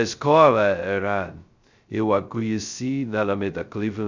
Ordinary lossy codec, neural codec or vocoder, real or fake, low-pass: Opus, 64 kbps; codec, 16 kHz, 0.2 kbps, FocalCodec; fake; 7.2 kHz